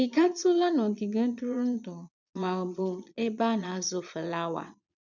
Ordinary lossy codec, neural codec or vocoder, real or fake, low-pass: none; vocoder, 22.05 kHz, 80 mel bands, Vocos; fake; 7.2 kHz